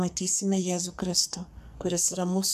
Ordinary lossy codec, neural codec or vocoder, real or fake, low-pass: AAC, 96 kbps; codec, 44.1 kHz, 2.6 kbps, SNAC; fake; 14.4 kHz